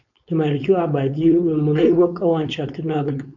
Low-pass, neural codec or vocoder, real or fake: 7.2 kHz; codec, 16 kHz, 4.8 kbps, FACodec; fake